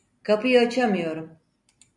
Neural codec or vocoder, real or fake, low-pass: none; real; 10.8 kHz